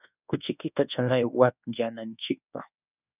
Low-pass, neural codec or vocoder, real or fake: 3.6 kHz; codec, 24 kHz, 1.2 kbps, DualCodec; fake